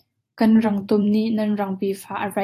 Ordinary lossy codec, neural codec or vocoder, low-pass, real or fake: AAC, 64 kbps; vocoder, 44.1 kHz, 128 mel bands every 512 samples, BigVGAN v2; 14.4 kHz; fake